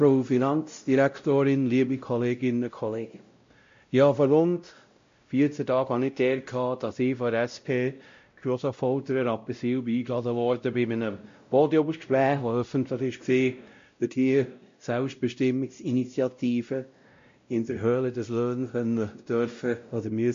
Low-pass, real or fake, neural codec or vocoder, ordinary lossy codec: 7.2 kHz; fake; codec, 16 kHz, 0.5 kbps, X-Codec, WavLM features, trained on Multilingual LibriSpeech; MP3, 48 kbps